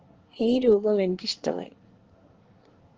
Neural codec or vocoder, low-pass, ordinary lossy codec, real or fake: codec, 24 kHz, 1 kbps, SNAC; 7.2 kHz; Opus, 16 kbps; fake